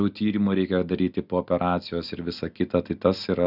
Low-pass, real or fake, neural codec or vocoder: 5.4 kHz; real; none